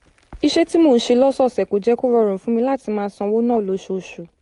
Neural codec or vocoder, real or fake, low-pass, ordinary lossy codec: none; real; 10.8 kHz; AAC, 48 kbps